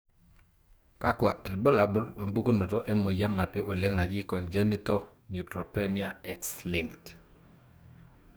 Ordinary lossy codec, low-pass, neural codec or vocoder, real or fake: none; none; codec, 44.1 kHz, 2.6 kbps, DAC; fake